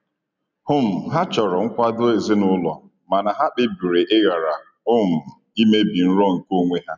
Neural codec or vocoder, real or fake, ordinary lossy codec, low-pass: none; real; none; 7.2 kHz